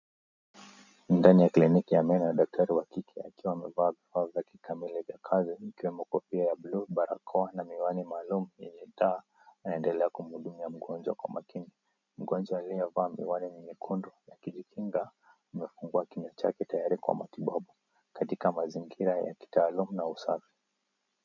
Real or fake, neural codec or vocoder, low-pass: real; none; 7.2 kHz